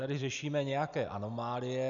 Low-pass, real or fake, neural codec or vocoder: 7.2 kHz; real; none